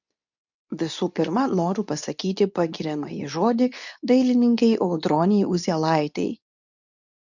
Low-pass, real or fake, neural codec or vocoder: 7.2 kHz; fake; codec, 24 kHz, 0.9 kbps, WavTokenizer, medium speech release version 2